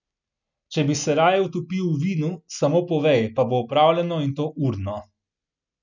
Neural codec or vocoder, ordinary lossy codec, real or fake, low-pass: none; none; real; 7.2 kHz